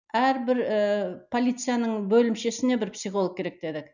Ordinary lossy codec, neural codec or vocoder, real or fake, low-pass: none; none; real; 7.2 kHz